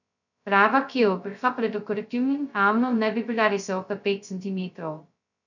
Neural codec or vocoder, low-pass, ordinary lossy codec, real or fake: codec, 16 kHz, 0.2 kbps, FocalCodec; 7.2 kHz; none; fake